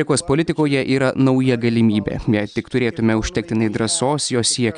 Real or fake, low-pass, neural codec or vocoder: real; 9.9 kHz; none